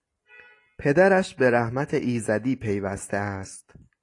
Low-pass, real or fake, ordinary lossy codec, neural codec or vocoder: 10.8 kHz; real; AAC, 48 kbps; none